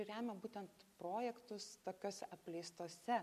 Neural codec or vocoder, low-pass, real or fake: none; 14.4 kHz; real